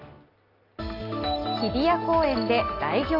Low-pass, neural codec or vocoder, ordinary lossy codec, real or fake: 5.4 kHz; none; Opus, 24 kbps; real